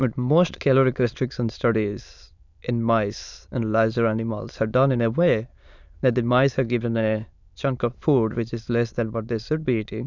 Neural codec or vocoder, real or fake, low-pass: autoencoder, 22.05 kHz, a latent of 192 numbers a frame, VITS, trained on many speakers; fake; 7.2 kHz